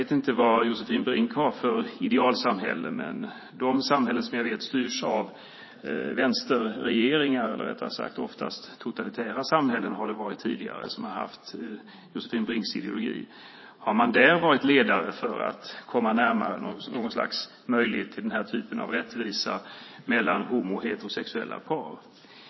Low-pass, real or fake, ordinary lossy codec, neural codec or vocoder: 7.2 kHz; fake; MP3, 24 kbps; vocoder, 44.1 kHz, 80 mel bands, Vocos